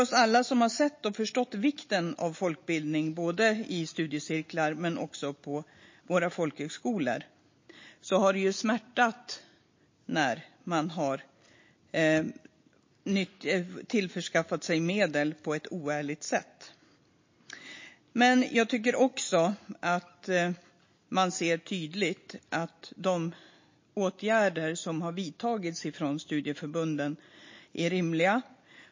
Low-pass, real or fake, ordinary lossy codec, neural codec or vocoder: 7.2 kHz; real; MP3, 32 kbps; none